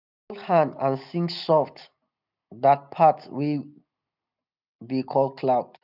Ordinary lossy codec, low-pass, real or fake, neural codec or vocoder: none; 5.4 kHz; real; none